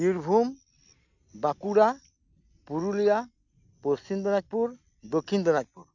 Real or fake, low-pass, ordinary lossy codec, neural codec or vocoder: real; 7.2 kHz; none; none